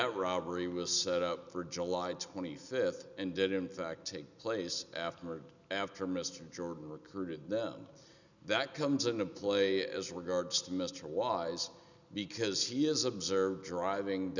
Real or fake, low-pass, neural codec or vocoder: real; 7.2 kHz; none